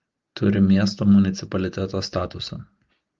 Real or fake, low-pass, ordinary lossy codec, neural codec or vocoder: real; 7.2 kHz; Opus, 32 kbps; none